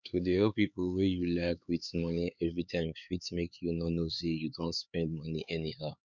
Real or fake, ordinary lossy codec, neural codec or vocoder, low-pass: fake; none; codec, 16 kHz, 4 kbps, X-Codec, HuBERT features, trained on LibriSpeech; 7.2 kHz